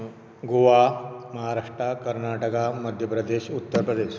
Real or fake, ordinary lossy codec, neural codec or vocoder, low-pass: real; none; none; none